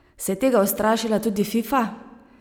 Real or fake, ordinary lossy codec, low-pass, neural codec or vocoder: real; none; none; none